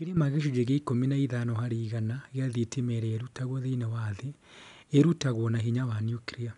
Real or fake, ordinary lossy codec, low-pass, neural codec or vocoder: real; none; 10.8 kHz; none